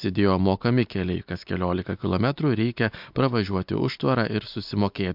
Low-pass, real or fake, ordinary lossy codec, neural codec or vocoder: 5.4 kHz; real; MP3, 48 kbps; none